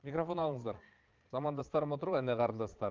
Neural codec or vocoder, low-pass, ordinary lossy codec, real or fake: vocoder, 22.05 kHz, 80 mel bands, Vocos; 7.2 kHz; Opus, 32 kbps; fake